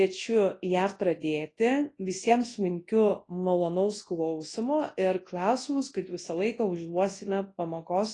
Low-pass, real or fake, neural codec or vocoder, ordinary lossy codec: 10.8 kHz; fake; codec, 24 kHz, 0.9 kbps, WavTokenizer, large speech release; AAC, 32 kbps